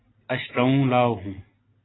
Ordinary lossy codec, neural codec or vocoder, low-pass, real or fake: AAC, 16 kbps; none; 7.2 kHz; real